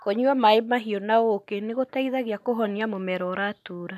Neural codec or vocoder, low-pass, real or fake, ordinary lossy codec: none; 14.4 kHz; real; none